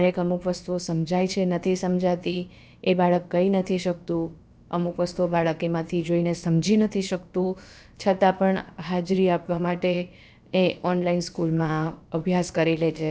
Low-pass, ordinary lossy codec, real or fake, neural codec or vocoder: none; none; fake; codec, 16 kHz, about 1 kbps, DyCAST, with the encoder's durations